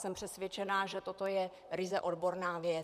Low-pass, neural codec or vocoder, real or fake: 14.4 kHz; vocoder, 44.1 kHz, 128 mel bands, Pupu-Vocoder; fake